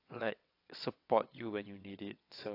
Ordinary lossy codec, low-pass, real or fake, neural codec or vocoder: AAC, 32 kbps; 5.4 kHz; fake; vocoder, 22.05 kHz, 80 mel bands, WaveNeXt